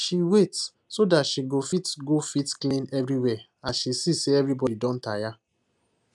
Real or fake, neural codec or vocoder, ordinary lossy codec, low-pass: real; none; none; 10.8 kHz